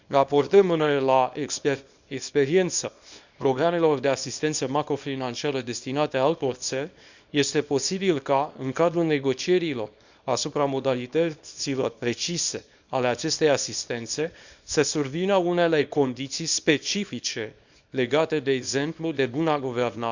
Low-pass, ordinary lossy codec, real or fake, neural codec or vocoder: 7.2 kHz; Opus, 64 kbps; fake; codec, 24 kHz, 0.9 kbps, WavTokenizer, small release